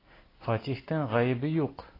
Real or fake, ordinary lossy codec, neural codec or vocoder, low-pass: real; AAC, 24 kbps; none; 5.4 kHz